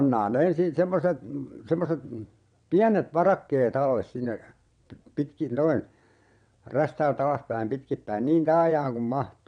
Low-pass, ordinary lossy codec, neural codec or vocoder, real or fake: 9.9 kHz; none; vocoder, 22.05 kHz, 80 mel bands, WaveNeXt; fake